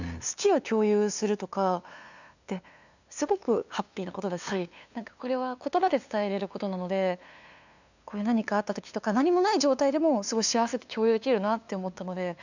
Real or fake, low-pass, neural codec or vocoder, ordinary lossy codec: fake; 7.2 kHz; codec, 16 kHz, 2 kbps, FunCodec, trained on LibriTTS, 25 frames a second; none